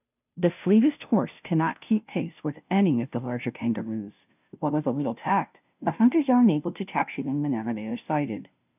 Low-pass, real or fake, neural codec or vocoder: 3.6 kHz; fake; codec, 16 kHz, 0.5 kbps, FunCodec, trained on Chinese and English, 25 frames a second